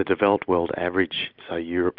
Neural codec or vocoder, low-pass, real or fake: none; 5.4 kHz; real